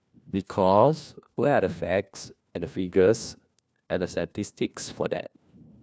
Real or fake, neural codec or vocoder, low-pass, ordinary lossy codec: fake; codec, 16 kHz, 1 kbps, FunCodec, trained on LibriTTS, 50 frames a second; none; none